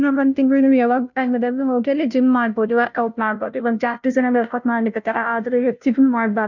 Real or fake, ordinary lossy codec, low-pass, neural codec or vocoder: fake; none; 7.2 kHz; codec, 16 kHz, 0.5 kbps, FunCodec, trained on Chinese and English, 25 frames a second